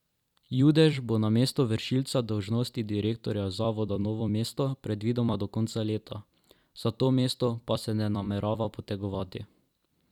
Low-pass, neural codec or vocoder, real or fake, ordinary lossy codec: 19.8 kHz; vocoder, 44.1 kHz, 128 mel bands every 256 samples, BigVGAN v2; fake; none